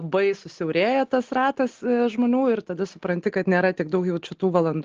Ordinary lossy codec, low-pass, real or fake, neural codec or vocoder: Opus, 16 kbps; 7.2 kHz; real; none